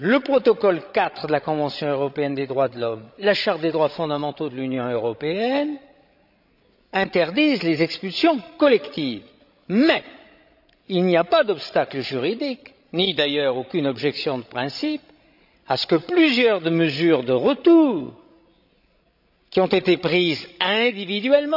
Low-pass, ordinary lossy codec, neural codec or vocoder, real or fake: 5.4 kHz; none; codec, 16 kHz, 16 kbps, FreqCodec, larger model; fake